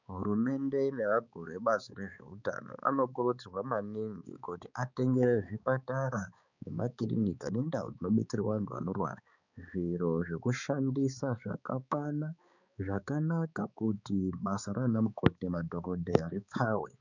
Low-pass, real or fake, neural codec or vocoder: 7.2 kHz; fake; codec, 16 kHz, 4 kbps, X-Codec, HuBERT features, trained on balanced general audio